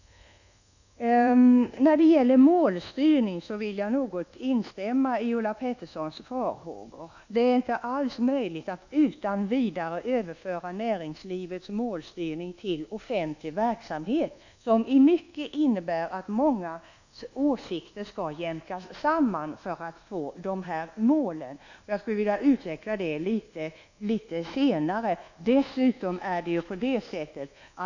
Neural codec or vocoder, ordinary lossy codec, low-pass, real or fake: codec, 24 kHz, 1.2 kbps, DualCodec; none; 7.2 kHz; fake